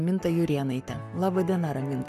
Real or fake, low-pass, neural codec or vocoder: fake; 14.4 kHz; codec, 44.1 kHz, 7.8 kbps, Pupu-Codec